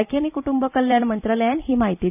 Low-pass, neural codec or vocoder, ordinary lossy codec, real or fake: 3.6 kHz; vocoder, 44.1 kHz, 128 mel bands every 256 samples, BigVGAN v2; none; fake